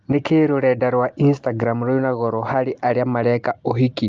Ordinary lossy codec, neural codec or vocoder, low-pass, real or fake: Opus, 32 kbps; none; 7.2 kHz; real